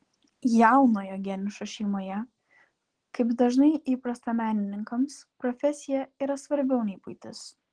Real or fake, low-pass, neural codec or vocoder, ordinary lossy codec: real; 9.9 kHz; none; Opus, 16 kbps